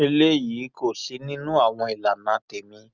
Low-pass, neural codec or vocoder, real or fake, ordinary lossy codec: 7.2 kHz; none; real; Opus, 64 kbps